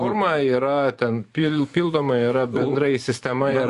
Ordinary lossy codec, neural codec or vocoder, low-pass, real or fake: Opus, 64 kbps; vocoder, 24 kHz, 100 mel bands, Vocos; 10.8 kHz; fake